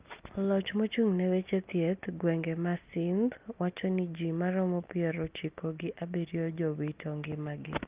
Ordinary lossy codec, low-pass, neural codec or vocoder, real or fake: Opus, 24 kbps; 3.6 kHz; none; real